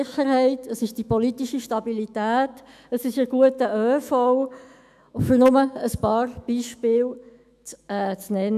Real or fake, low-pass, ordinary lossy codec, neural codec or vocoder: fake; 14.4 kHz; none; autoencoder, 48 kHz, 128 numbers a frame, DAC-VAE, trained on Japanese speech